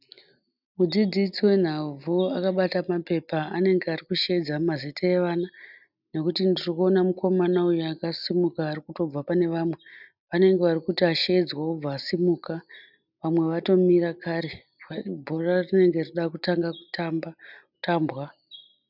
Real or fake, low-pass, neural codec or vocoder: real; 5.4 kHz; none